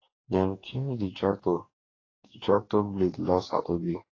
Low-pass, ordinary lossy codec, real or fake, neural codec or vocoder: 7.2 kHz; AAC, 32 kbps; fake; codec, 44.1 kHz, 2.6 kbps, DAC